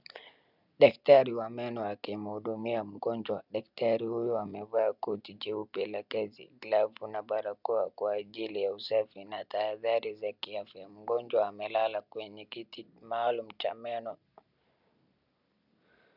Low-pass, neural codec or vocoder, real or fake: 5.4 kHz; none; real